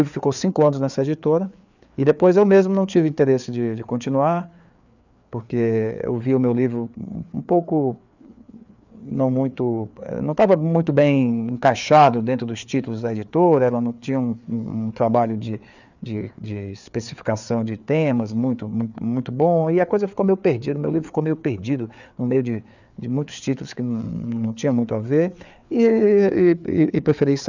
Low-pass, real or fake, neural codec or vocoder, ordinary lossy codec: 7.2 kHz; fake; codec, 16 kHz, 4 kbps, FreqCodec, larger model; none